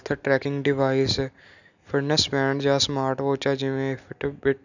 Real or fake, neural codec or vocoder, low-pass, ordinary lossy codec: real; none; 7.2 kHz; none